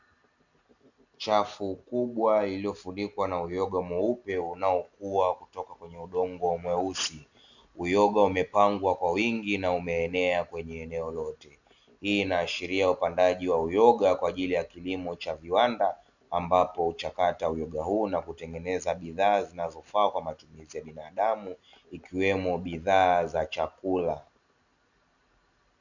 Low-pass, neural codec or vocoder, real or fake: 7.2 kHz; none; real